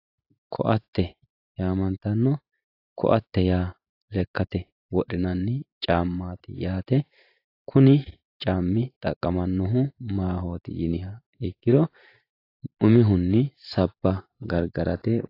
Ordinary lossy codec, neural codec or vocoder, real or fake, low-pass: AAC, 32 kbps; vocoder, 44.1 kHz, 128 mel bands every 512 samples, BigVGAN v2; fake; 5.4 kHz